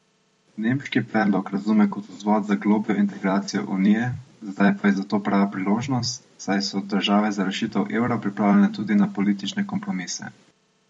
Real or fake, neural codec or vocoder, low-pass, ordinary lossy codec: fake; vocoder, 44.1 kHz, 128 mel bands every 256 samples, BigVGAN v2; 19.8 kHz; MP3, 48 kbps